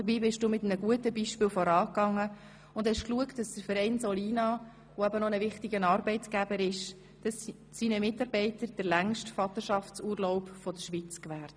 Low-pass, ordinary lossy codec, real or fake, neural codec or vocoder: none; none; real; none